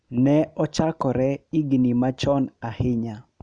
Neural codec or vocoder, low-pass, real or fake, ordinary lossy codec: none; 9.9 kHz; real; none